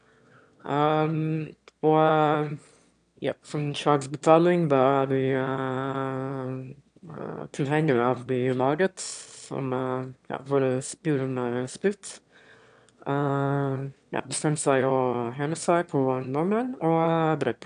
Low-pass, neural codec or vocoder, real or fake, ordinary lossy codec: 9.9 kHz; autoencoder, 22.05 kHz, a latent of 192 numbers a frame, VITS, trained on one speaker; fake; AAC, 96 kbps